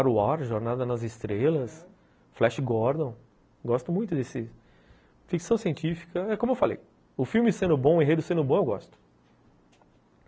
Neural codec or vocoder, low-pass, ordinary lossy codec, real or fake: none; none; none; real